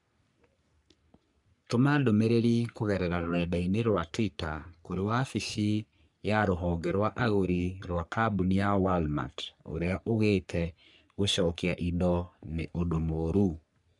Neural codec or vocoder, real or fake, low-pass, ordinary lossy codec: codec, 44.1 kHz, 3.4 kbps, Pupu-Codec; fake; 10.8 kHz; none